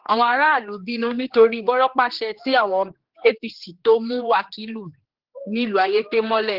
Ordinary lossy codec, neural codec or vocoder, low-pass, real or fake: Opus, 16 kbps; codec, 16 kHz, 2 kbps, X-Codec, HuBERT features, trained on general audio; 5.4 kHz; fake